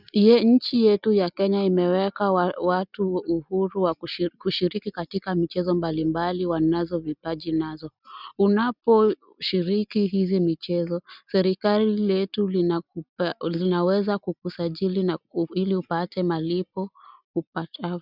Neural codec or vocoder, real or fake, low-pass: none; real; 5.4 kHz